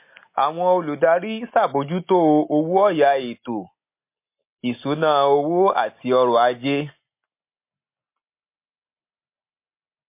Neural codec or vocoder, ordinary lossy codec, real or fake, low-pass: none; MP3, 24 kbps; real; 3.6 kHz